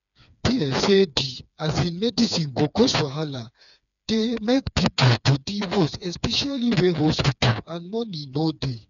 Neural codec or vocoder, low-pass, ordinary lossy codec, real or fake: codec, 16 kHz, 4 kbps, FreqCodec, smaller model; 7.2 kHz; none; fake